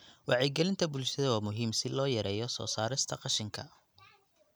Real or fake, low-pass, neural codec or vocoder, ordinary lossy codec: real; none; none; none